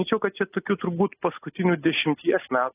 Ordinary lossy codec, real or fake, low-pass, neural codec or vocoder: AAC, 16 kbps; real; 3.6 kHz; none